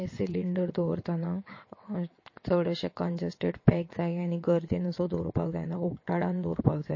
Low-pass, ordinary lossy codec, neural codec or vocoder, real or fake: 7.2 kHz; MP3, 32 kbps; vocoder, 22.05 kHz, 80 mel bands, WaveNeXt; fake